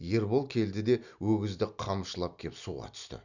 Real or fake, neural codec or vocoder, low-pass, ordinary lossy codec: real; none; 7.2 kHz; none